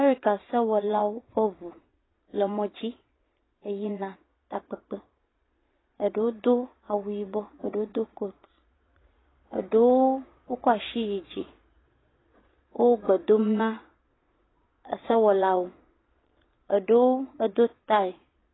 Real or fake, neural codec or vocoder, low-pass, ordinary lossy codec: fake; vocoder, 22.05 kHz, 80 mel bands, Vocos; 7.2 kHz; AAC, 16 kbps